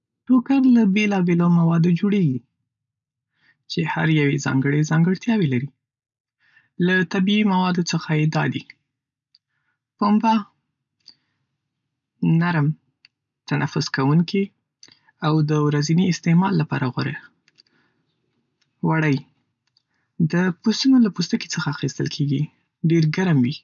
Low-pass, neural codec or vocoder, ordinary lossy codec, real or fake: 7.2 kHz; none; none; real